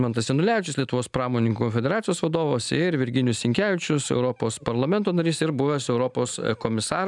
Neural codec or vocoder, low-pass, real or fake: none; 10.8 kHz; real